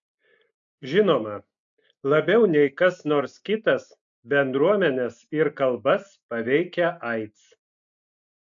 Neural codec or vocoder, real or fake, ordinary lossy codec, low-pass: none; real; AAC, 64 kbps; 7.2 kHz